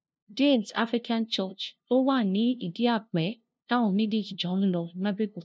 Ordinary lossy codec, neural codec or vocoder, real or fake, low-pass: none; codec, 16 kHz, 0.5 kbps, FunCodec, trained on LibriTTS, 25 frames a second; fake; none